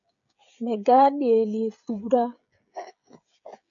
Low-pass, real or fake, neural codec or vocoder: 7.2 kHz; fake; codec, 16 kHz, 16 kbps, FreqCodec, smaller model